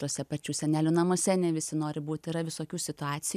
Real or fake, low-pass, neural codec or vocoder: real; 14.4 kHz; none